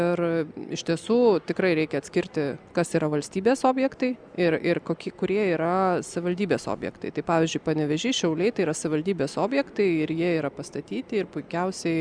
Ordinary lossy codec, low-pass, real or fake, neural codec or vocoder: Opus, 64 kbps; 9.9 kHz; real; none